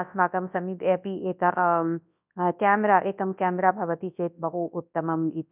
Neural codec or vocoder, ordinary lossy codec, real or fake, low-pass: codec, 24 kHz, 0.9 kbps, WavTokenizer, large speech release; none; fake; 3.6 kHz